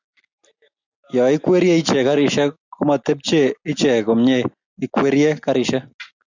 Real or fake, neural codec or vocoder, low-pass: real; none; 7.2 kHz